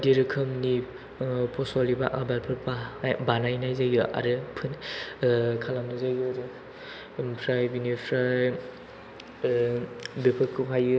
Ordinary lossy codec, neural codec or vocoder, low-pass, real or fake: none; none; none; real